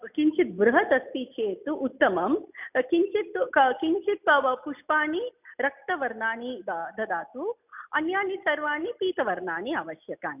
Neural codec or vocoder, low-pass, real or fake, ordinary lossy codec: none; 3.6 kHz; real; none